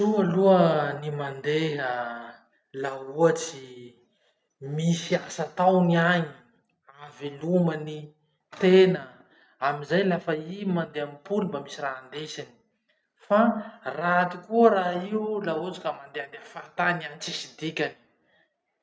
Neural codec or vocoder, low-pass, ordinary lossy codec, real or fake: none; none; none; real